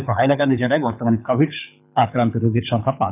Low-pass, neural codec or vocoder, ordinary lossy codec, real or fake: 3.6 kHz; codec, 24 kHz, 6 kbps, HILCodec; none; fake